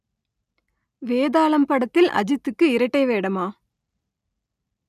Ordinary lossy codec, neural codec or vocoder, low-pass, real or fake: none; none; 14.4 kHz; real